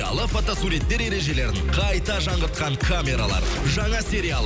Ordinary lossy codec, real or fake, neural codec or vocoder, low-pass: none; real; none; none